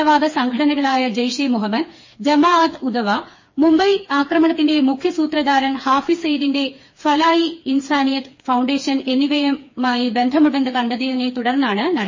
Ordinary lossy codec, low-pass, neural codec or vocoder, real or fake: MP3, 32 kbps; 7.2 kHz; codec, 16 kHz, 4 kbps, FreqCodec, smaller model; fake